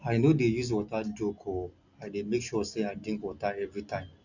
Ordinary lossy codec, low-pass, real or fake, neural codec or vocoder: none; 7.2 kHz; real; none